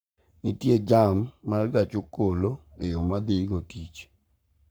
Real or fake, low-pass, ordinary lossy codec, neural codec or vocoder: fake; none; none; codec, 44.1 kHz, 7.8 kbps, Pupu-Codec